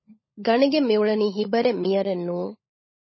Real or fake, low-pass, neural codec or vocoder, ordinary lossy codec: fake; 7.2 kHz; codec, 16 kHz, 16 kbps, FunCodec, trained on LibriTTS, 50 frames a second; MP3, 24 kbps